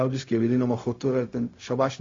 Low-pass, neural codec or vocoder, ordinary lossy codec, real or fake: 7.2 kHz; codec, 16 kHz, 0.4 kbps, LongCat-Audio-Codec; AAC, 32 kbps; fake